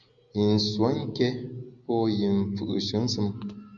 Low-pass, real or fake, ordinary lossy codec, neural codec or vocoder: 7.2 kHz; real; Opus, 64 kbps; none